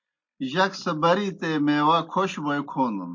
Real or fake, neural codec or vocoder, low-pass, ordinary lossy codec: real; none; 7.2 kHz; MP3, 48 kbps